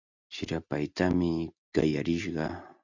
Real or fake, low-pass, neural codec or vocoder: real; 7.2 kHz; none